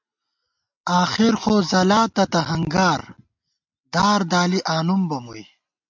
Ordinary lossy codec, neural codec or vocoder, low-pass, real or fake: MP3, 64 kbps; none; 7.2 kHz; real